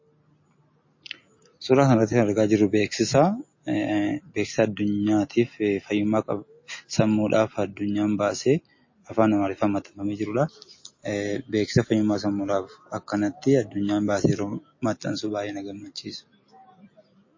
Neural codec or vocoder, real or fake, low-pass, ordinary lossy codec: none; real; 7.2 kHz; MP3, 32 kbps